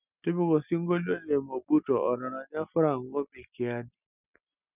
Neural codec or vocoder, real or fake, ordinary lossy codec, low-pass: none; real; none; 3.6 kHz